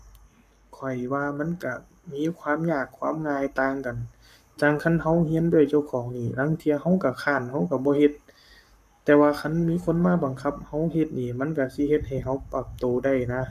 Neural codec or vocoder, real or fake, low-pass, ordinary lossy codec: codec, 44.1 kHz, 7.8 kbps, Pupu-Codec; fake; 14.4 kHz; none